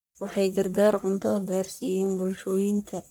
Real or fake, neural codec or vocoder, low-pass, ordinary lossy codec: fake; codec, 44.1 kHz, 1.7 kbps, Pupu-Codec; none; none